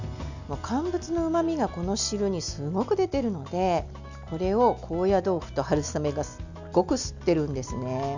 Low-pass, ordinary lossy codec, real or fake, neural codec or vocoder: 7.2 kHz; none; real; none